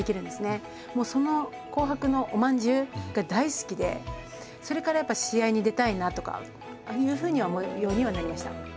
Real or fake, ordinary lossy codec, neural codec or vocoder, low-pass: real; none; none; none